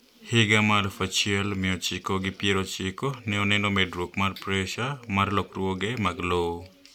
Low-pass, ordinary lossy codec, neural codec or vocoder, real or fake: 19.8 kHz; none; none; real